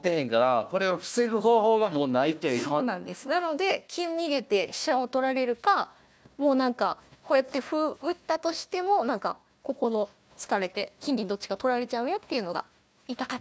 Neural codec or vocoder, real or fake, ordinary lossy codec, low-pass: codec, 16 kHz, 1 kbps, FunCodec, trained on Chinese and English, 50 frames a second; fake; none; none